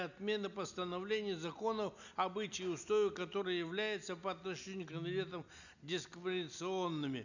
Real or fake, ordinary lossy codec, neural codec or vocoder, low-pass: real; none; none; 7.2 kHz